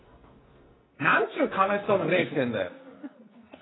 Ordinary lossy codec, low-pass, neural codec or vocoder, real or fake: AAC, 16 kbps; 7.2 kHz; codec, 44.1 kHz, 2.6 kbps, SNAC; fake